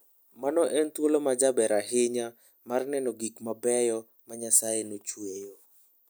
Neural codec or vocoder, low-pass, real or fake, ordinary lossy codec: none; none; real; none